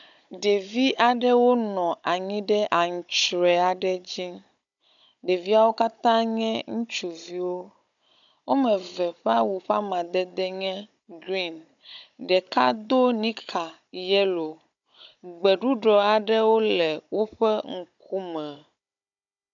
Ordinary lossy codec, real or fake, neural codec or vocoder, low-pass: MP3, 96 kbps; fake; codec, 16 kHz, 16 kbps, FunCodec, trained on Chinese and English, 50 frames a second; 7.2 kHz